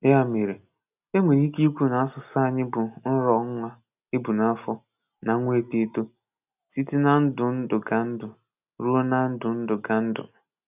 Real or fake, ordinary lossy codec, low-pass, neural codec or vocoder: real; none; 3.6 kHz; none